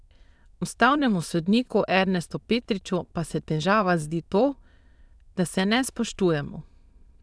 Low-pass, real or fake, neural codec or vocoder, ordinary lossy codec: none; fake; autoencoder, 22.05 kHz, a latent of 192 numbers a frame, VITS, trained on many speakers; none